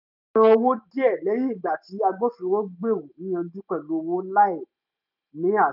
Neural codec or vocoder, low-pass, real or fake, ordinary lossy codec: none; 5.4 kHz; real; none